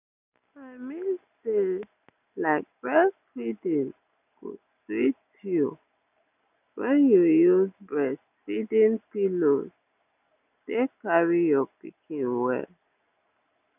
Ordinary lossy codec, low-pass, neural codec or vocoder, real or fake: none; 3.6 kHz; none; real